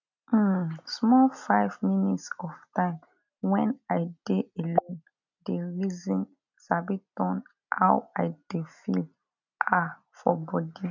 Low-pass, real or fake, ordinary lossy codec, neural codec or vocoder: 7.2 kHz; real; none; none